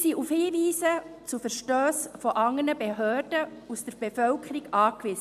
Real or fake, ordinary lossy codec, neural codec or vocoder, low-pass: real; MP3, 96 kbps; none; 14.4 kHz